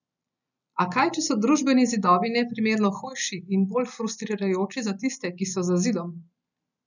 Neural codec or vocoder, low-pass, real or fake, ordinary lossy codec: none; 7.2 kHz; real; none